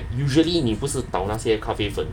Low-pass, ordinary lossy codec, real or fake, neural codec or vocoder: 19.8 kHz; none; real; none